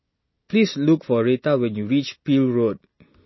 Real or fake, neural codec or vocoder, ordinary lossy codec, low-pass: real; none; MP3, 24 kbps; 7.2 kHz